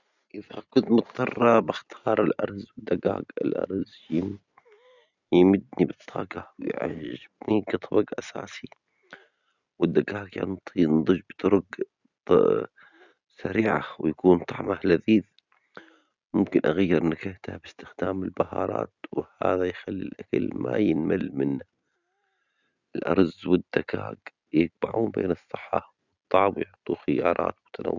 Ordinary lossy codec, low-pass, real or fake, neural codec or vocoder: none; 7.2 kHz; real; none